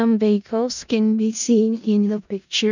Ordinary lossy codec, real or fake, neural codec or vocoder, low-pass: none; fake; codec, 16 kHz in and 24 kHz out, 0.4 kbps, LongCat-Audio-Codec, four codebook decoder; 7.2 kHz